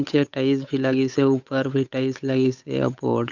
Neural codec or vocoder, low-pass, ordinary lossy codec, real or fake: codec, 16 kHz, 8 kbps, FunCodec, trained on Chinese and English, 25 frames a second; 7.2 kHz; none; fake